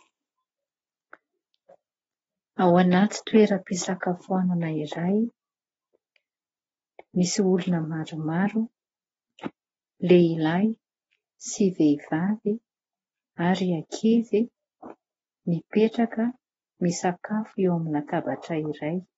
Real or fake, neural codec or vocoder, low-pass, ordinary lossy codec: real; none; 19.8 kHz; AAC, 24 kbps